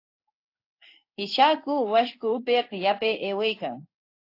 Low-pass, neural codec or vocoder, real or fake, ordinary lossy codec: 5.4 kHz; codec, 16 kHz in and 24 kHz out, 1 kbps, XY-Tokenizer; fake; AAC, 32 kbps